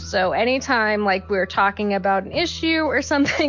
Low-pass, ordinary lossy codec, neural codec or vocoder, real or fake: 7.2 kHz; MP3, 48 kbps; none; real